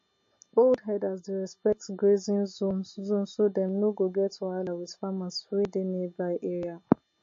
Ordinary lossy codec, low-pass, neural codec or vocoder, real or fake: MP3, 32 kbps; 7.2 kHz; none; real